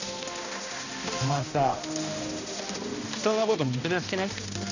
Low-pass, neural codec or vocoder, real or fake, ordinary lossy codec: 7.2 kHz; codec, 16 kHz, 1 kbps, X-Codec, HuBERT features, trained on balanced general audio; fake; none